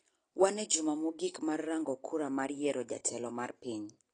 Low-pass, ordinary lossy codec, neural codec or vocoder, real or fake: 9.9 kHz; AAC, 32 kbps; none; real